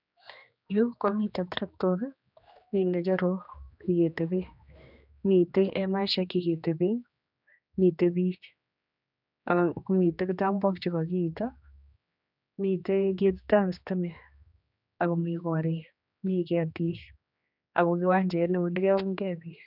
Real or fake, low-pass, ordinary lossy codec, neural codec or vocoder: fake; 5.4 kHz; none; codec, 16 kHz, 2 kbps, X-Codec, HuBERT features, trained on general audio